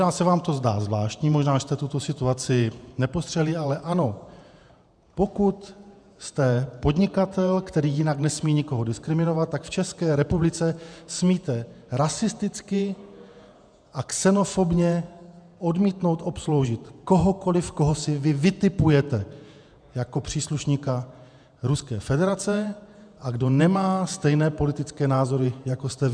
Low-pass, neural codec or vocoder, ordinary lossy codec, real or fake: 9.9 kHz; vocoder, 48 kHz, 128 mel bands, Vocos; MP3, 96 kbps; fake